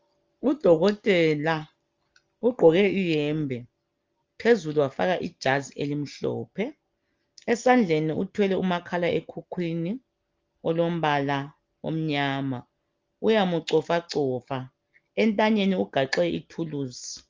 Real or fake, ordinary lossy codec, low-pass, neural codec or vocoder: real; Opus, 32 kbps; 7.2 kHz; none